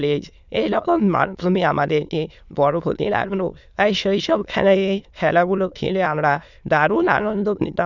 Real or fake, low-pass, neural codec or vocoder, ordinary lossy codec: fake; 7.2 kHz; autoencoder, 22.05 kHz, a latent of 192 numbers a frame, VITS, trained on many speakers; none